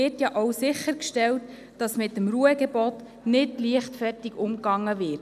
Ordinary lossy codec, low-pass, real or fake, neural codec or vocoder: none; 14.4 kHz; real; none